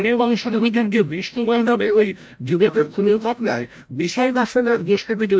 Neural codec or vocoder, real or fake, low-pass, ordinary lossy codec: codec, 16 kHz, 0.5 kbps, FreqCodec, larger model; fake; none; none